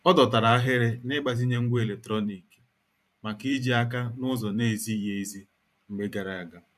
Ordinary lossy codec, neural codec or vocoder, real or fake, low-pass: none; vocoder, 44.1 kHz, 128 mel bands every 512 samples, BigVGAN v2; fake; 14.4 kHz